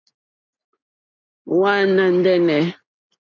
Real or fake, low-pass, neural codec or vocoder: real; 7.2 kHz; none